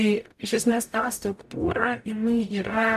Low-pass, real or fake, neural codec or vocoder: 14.4 kHz; fake; codec, 44.1 kHz, 0.9 kbps, DAC